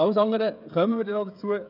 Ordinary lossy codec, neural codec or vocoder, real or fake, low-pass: none; codec, 16 kHz, 16 kbps, FreqCodec, smaller model; fake; 5.4 kHz